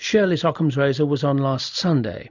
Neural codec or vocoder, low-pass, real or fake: none; 7.2 kHz; real